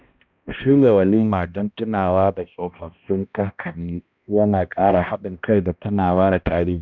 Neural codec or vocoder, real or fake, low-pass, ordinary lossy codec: codec, 16 kHz, 1 kbps, X-Codec, HuBERT features, trained on balanced general audio; fake; 7.2 kHz; none